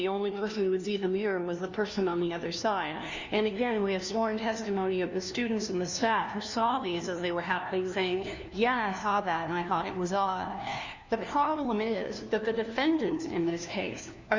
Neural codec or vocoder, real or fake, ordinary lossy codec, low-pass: codec, 16 kHz, 1 kbps, FunCodec, trained on LibriTTS, 50 frames a second; fake; Opus, 64 kbps; 7.2 kHz